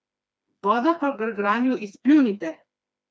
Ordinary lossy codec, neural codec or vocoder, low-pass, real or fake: none; codec, 16 kHz, 2 kbps, FreqCodec, smaller model; none; fake